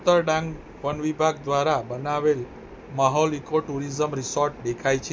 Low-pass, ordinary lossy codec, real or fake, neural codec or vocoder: none; none; real; none